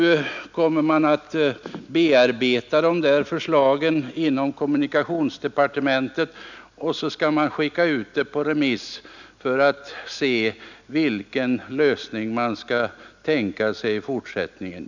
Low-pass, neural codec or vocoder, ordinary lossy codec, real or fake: 7.2 kHz; none; none; real